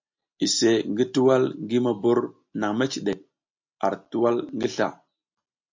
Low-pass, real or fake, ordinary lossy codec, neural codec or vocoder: 7.2 kHz; real; MP3, 48 kbps; none